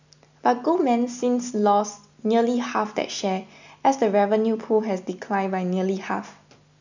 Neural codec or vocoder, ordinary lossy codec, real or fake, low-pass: none; none; real; 7.2 kHz